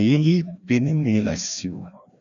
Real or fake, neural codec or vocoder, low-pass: fake; codec, 16 kHz, 1 kbps, FreqCodec, larger model; 7.2 kHz